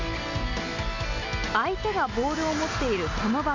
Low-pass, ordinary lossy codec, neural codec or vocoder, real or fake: 7.2 kHz; MP3, 48 kbps; none; real